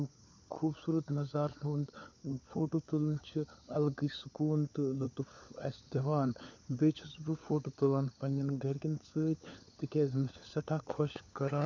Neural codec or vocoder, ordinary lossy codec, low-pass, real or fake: codec, 16 kHz, 4 kbps, FunCodec, trained on LibriTTS, 50 frames a second; none; 7.2 kHz; fake